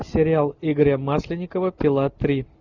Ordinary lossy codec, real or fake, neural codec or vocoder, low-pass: Opus, 64 kbps; real; none; 7.2 kHz